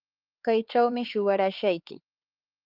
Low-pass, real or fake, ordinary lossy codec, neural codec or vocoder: 5.4 kHz; fake; Opus, 32 kbps; codec, 16 kHz, 4 kbps, X-Codec, HuBERT features, trained on LibriSpeech